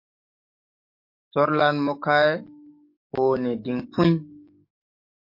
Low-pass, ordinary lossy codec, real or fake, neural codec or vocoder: 5.4 kHz; MP3, 32 kbps; fake; codec, 44.1 kHz, 7.8 kbps, DAC